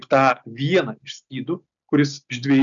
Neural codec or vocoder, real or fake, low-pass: none; real; 7.2 kHz